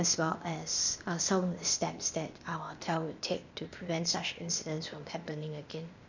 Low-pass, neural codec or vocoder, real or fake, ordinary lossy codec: 7.2 kHz; codec, 16 kHz, 0.8 kbps, ZipCodec; fake; none